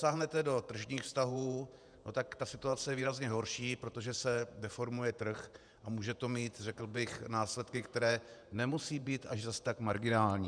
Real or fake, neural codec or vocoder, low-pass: fake; vocoder, 48 kHz, 128 mel bands, Vocos; 9.9 kHz